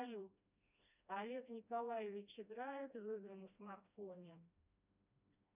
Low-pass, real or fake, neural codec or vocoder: 3.6 kHz; fake; codec, 16 kHz, 1 kbps, FreqCodec, smaller model